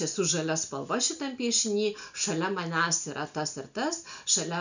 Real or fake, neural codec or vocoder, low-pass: real; none; 7.2 kHz